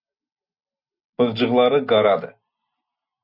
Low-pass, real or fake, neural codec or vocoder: 5.4 kHz; real; none